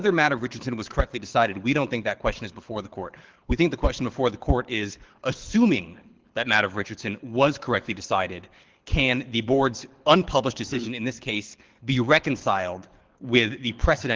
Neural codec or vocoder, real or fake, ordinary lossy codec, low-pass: codec, 24 kHz, 6 kbps, HILCodec; fake; Opus, 16 kbps; 7.2 kHz